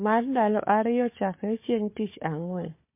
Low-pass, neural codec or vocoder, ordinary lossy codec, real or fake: 3.6 kHz; codec, 16 kHz, 4 kbps, FunCodec, trained on Chinese and English, 50 frames a second; MP3, 24 kbps; fake